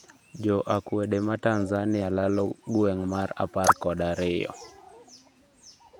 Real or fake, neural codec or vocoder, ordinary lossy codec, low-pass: fake; vocoder, 48 kHz, 128 mel bands, Vocos; none; 19.8 kHz